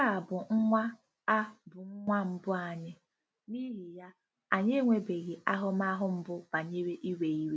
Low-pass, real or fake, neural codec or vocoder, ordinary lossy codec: none; real; none; none